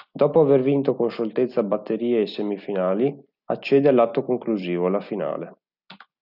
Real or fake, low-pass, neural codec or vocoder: real; 5.4 kHz; none